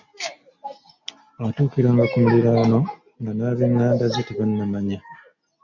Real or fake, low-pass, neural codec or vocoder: real; 7.2 kHz; none